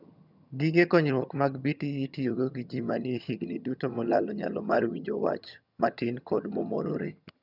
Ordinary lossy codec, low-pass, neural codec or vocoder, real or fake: none; 5.4 kHz; vocoder, 22.05 kHz, 80 mel bands, HiFi-GAN; fake